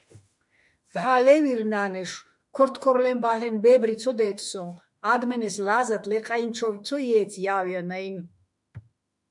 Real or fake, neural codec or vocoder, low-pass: fake; autoencoder, 48 kHz, 32 numbers a frame, DAC-VAE, trained on Japanese speech; 10.8 kHz